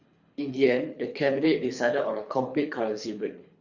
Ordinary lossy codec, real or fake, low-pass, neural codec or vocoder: Opus, 64 kbps; fake; 7.2 kHz; codec, 24 kHz, 3 kbps, HILCodec